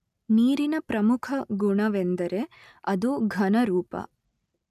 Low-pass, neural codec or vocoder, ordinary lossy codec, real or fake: 14.4 kHz; none; none; real